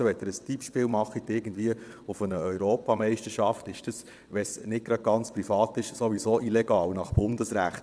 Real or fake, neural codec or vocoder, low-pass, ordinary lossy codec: fake; vocoder, 22.05 kHz, 80 mel bands, WaveNeXt; none; none